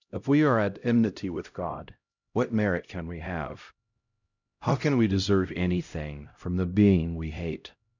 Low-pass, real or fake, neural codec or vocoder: 7.2 kHz; fake; codec, 16 kHz, 0.5 kbps, X-Codec, HuBERT features, trained on LibriSpeech